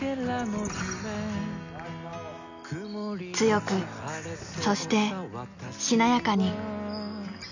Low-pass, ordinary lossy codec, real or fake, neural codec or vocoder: 7.2 kHz; none; real; none